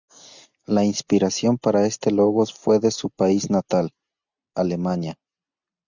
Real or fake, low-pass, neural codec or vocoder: real; 7.2 kHz; none